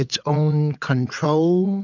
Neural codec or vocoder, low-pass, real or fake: vocoder, 22.05 kHz, 80 mel bands, WaveNeXt; 7.2 kHz; fake